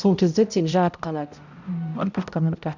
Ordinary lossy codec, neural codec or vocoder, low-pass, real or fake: none; codec, 16 kHz, 0.5 kbps, X-Codec, HuBERT features, trained on balanced general audio; 7.2 kHz; fake